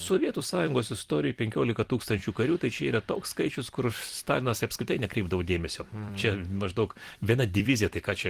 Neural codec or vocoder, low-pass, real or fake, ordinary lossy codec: vocoder, 44.1 kHz, 128 mel bands every 256 samples, BigVGAN v2; 14.4 kHz; fake; Opus, 24 kbps